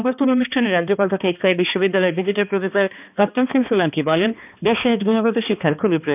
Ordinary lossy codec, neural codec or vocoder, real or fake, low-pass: none; codec, 16 kHz, 2 kbps, X-Codec, HuBERT features, trained on balanced general audio; fake; 3.6 kHz